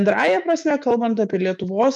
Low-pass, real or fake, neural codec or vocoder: 10.8 kHz; fake; autoencoder, 48 kHz, 128 numbers a frame, DAC-VAE, trained on Japanese speech